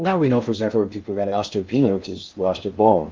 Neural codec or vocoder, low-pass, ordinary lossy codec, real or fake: codec, 16 kHz in and 24 kHz out, 0.6 kbps, FocalCodec, streaming, 2048 codes; 7.2 kHz; Opus, 24 kbps; fake